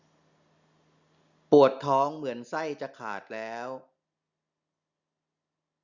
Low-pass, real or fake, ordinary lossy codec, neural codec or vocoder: 7.2 kHz; real; Opus, 64 kbps; none